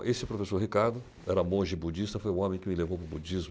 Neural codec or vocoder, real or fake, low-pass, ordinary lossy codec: none; real; none; none